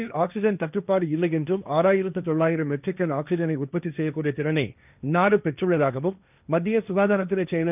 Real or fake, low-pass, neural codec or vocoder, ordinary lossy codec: fake; 3.6 kHz; codec, 16 kHz, 1.1 kbps, Voila-Tokenizer; none